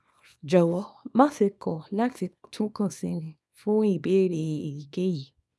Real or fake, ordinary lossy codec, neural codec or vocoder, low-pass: fake; none; codec, 24 kHz, 0.9 kbps, WavTokenizer, small release; none